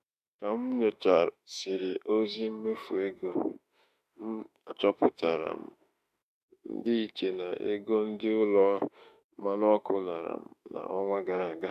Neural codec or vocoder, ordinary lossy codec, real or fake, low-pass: autoencoder, 48 kHz, 32 numbers a frame, DAC-VAE, trained on Japanese speech; none; fake; 14.4 kHz